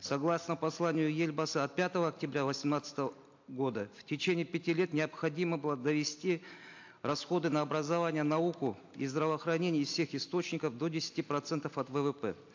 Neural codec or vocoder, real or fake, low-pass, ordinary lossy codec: none; real; 7.2 kHz; AAC, 48 kbps